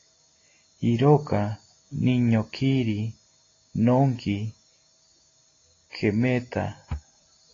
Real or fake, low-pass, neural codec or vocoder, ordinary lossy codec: real; 7.2 kHz; none; AAC, 32 kbps